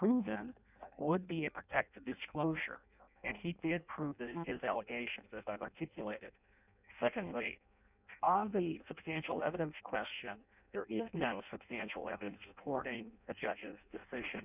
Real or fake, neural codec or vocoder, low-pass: fake; codec, 16 kHz in and 24 kHz out, 0.6 kbps, FireRedTTS-2 codec; 3.6 kHz